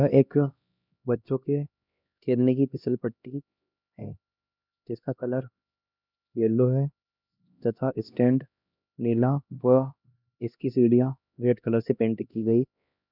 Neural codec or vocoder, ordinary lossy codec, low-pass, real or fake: codec, 16 kHz, 1 kbps, X-Codec, HuBERT features, trained on LibriSpeech; none; 5.4 kHz; fake